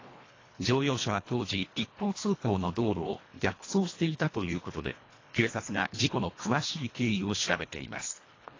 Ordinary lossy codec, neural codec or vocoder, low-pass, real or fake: AAC, 32 kbps; codec, 24 kHz, 1.5 kbps, HILCodec; 7.2 kHz; fake